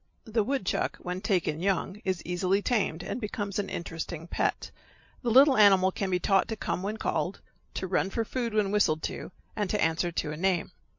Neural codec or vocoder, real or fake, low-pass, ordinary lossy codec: none; real; 7.2 kHz; MP3, 48 kbps